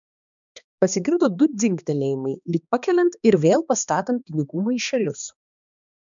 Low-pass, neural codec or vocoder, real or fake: 7.2 kHz; codec, 16 kHz, 2 kbps, X-Codec, HuBERT features, trained on balanced general audio; fake